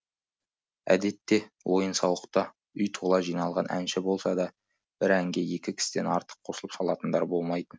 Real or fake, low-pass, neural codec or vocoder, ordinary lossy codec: real; none; none; none